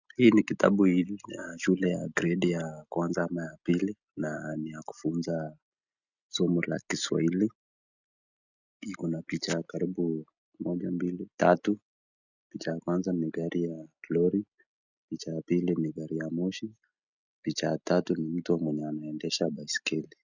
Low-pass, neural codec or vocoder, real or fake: 7.2 kHz; none; real